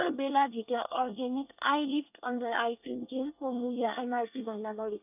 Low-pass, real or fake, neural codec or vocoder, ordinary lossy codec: 3.6 kHz; fake; codec, 24 kHz, 1 kbps, SNAC; none